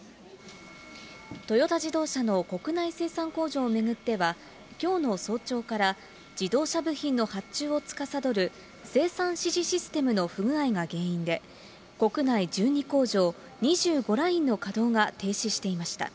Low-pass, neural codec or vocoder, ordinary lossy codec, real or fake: none; none; none; real